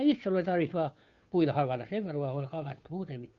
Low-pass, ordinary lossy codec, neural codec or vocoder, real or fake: 7.2 kHz; Opus, 64 kbps; codec, 16 kHz, 2 kbps, FunCodec, trained on Chinese and English, 25 frames a second; fake